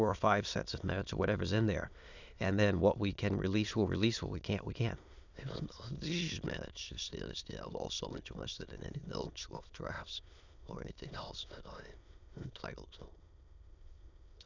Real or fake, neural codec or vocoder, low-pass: fake; autoencoder, 22.05 kHz, a latent of 192 numbers a frame, VITS, trained on many speakers; 7.2 kHz